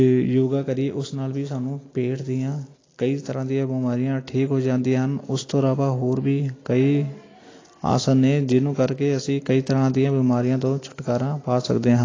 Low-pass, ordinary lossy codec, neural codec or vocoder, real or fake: 7.2 kHz; AAC, 32 kbps; none; real